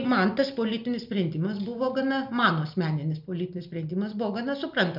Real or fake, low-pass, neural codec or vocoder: real; 5.4 kHz; none